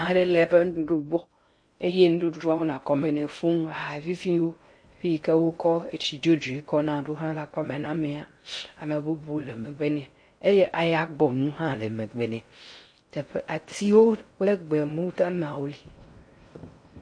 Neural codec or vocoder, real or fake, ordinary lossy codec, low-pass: codec, 16 kHz in and 24 kHz out, 0.6 kbps, FocalCodec, streaming, 2048 codes; fake; MP3, 48 kbps; 9.9 kHz